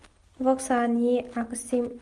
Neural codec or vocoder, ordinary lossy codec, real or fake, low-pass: none; Opus, 32 kbps; real; 10.8 kHz